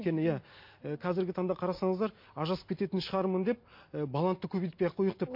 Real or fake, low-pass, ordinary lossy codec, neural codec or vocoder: real; 5.4 kHz; MP3, 32 kbps; none